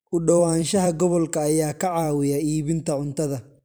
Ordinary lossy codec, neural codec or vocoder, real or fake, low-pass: none; vocoder, 44.1 kHz, 128 mel bands every 256 samples, BigVGAN v2; fake; none